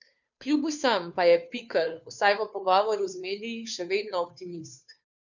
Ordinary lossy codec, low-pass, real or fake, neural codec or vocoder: none; 7.2 kHz; fake; codec, 16 kHz, 2 kbps, FunCodec, trained on Chinese and English, 25 frames a second